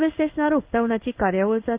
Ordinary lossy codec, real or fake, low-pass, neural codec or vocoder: Opus, 24 kbps; fake; 3.6 kHz; codec, 16 kHz in and 24 kHz out, 1 kbps, XY-Tokenizer